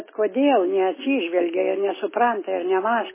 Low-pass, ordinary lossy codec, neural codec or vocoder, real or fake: 3.6 kHz; MP3, 16 kbps; none; real